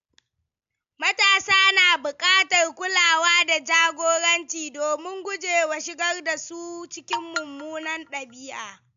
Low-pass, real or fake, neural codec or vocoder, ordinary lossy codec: 7.2 kHz; real; none; MP3, 64 kbps